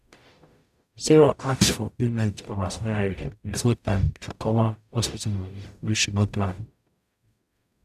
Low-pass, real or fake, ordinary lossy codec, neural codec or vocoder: 14.4 kHz; fake; none; codec, 44.1 kHz, 0.9 kbps, DAC